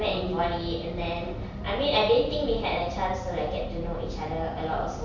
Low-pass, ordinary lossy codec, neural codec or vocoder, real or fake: 7.2 kHz; none; none; real